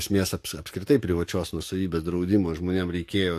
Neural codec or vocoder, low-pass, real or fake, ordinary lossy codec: codec, 44.1 kHz, 7.8 kbps, DAC; 14.4 kHz; fake; AAC, 64 kbps